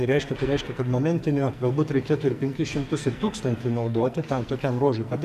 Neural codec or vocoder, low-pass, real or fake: codec, 44.1 kHz, 2.6 kbps, SNAC; 14.4 kHz; fake